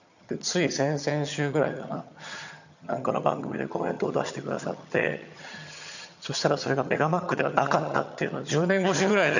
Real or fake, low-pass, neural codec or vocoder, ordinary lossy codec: fake; 7.2 kHz; vocoder, 22.05 kHz, 80 mel bands, HiFi-GAN; none